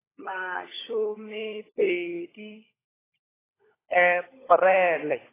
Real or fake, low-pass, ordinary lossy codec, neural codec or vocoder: fake; 3.6 kHz; AAC, 16 kbps; codec, 16 kHz, 16 kbps, FunCodec, trained on LibriTTS, 50 frames a second